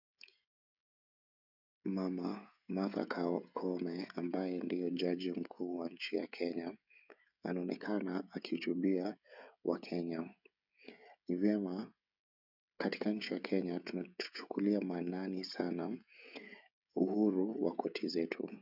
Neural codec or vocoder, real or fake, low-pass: codec, 16 kHz, 16 kbps, FreqCodec, smaller model; fake; 5.4 kHz